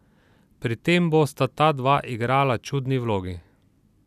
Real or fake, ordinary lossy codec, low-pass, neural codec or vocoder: real; none; 14.4 kHz; none